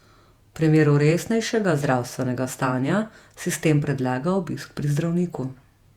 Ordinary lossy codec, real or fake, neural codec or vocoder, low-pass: Opus, 64 kbps; fake; vocoder, 48 kHz, 128 mel bands, Vocos; 19.8 kHz